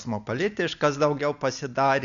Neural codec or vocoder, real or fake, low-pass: none; real; 7.2 kHz